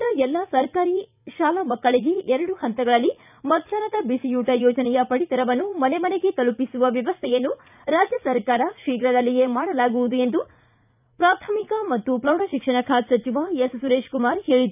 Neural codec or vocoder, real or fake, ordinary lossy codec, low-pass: vocoder, 44.1 kHz, 80 mel bands, Vocos; fake; none; 3.6 kHz